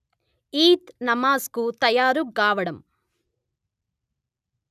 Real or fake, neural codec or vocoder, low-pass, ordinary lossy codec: real; none; 14.4 kHz; none